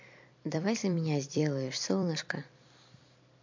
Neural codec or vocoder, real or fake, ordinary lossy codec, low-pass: vocoder, 44.1 kHz, 128 mel bands every 256 samples, BigVGAN v2; fake; MP3, 48 kbps; 7.2 kHz